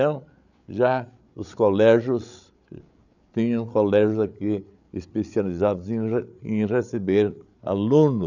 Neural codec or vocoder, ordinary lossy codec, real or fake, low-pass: codec, 16 kHz, 8 kbps, FreqCodec, larger model; none; fake; 7.2 kHz